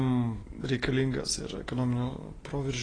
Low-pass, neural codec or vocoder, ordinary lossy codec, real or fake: 9.9 kHz; none; AAC, 32 kbps; real